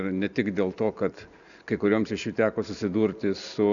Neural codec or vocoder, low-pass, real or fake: none; 7.2 kHz; real